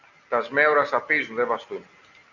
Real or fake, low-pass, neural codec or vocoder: real; 7.2 kHz; none